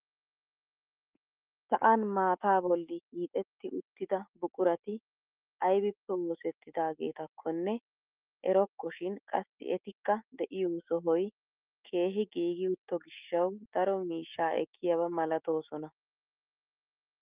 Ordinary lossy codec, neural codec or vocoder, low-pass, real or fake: Opus, 24 kbps; autoencoder, 48 kHz, 128 numbers a frame, DAC-VAE, trained on Japanese speech; 3.6 kHz; fake